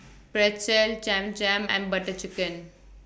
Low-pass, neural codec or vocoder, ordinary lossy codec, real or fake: none; none; none; real